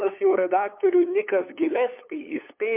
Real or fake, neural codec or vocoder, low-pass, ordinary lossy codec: fake; codec, 16 kHz, 8 kbps, FunCodec, trained on LibriTTS, 25 frames a second; 3.6 kHz; MP3, 32 kbps